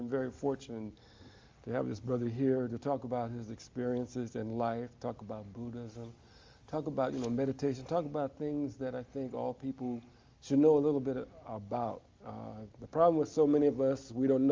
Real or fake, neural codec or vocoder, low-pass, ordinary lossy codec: real; none; 7.2 kHz; Opus, 32 kbps